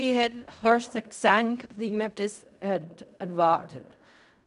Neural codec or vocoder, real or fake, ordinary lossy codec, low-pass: codec, 16 kHz in and 24 kHz out, 0.4 kbps, LongCat-Audio-Codec, fine tuned four codebook decoder; fake; none; 10.8 kHz